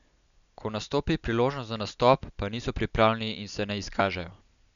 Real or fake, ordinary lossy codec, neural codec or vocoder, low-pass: real; none; none; 7.2 kHz